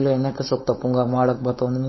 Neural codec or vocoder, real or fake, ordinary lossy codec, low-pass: codec, 16 kHz, 4.8 kbps, FACodec; fake; MP3, 24 kbps; 7.2 kHz